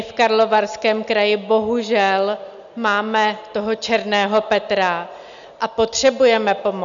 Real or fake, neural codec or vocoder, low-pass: real; none; 7.2 kHz